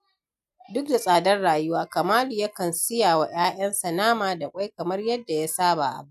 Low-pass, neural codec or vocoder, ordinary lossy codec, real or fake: 14.4 kHz; none; none; real